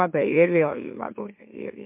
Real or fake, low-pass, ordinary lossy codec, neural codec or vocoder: fake; 3.6 kHz; MP3, 32 kbps; autoencoder, 44.1 kHz, a latent of 192 numbers a frame, MeloTTS